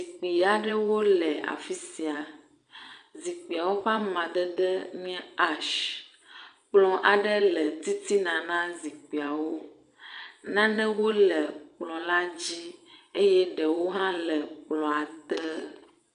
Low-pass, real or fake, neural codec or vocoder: 9.9 kHz; fake; vocoder, 22.05 kHz, 80 mel bands, Vocos